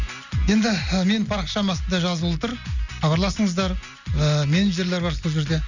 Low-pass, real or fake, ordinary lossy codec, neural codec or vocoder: 7.2 kHz; real; none; none